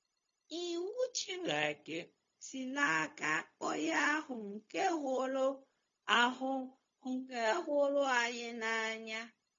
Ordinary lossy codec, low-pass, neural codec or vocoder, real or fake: MP3, 32 kbps; 7.2 kHz; codec, 16 kHz, 0.4 kbps, LongCat-Audio-Codec; fake